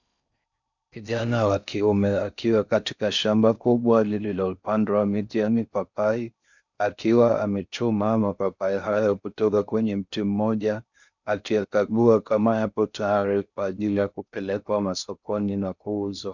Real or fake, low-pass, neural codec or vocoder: fake; 7.2 kHz; codec, 16 kHz in and 24 kHz out, 0.6 kbps, FocalCodec, streaming, 4096 codes